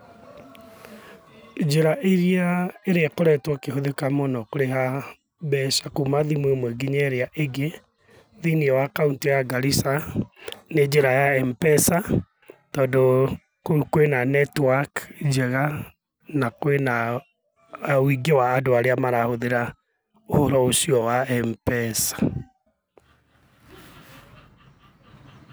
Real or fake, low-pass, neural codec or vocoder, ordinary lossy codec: real; none; none; none